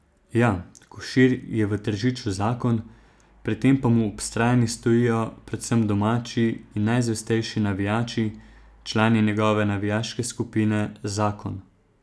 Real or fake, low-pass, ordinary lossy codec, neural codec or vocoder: real; none; none; none